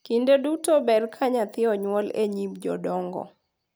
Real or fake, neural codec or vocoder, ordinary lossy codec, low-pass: real; none; none; none